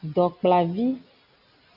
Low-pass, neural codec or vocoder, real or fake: 5.4 kHz; none; real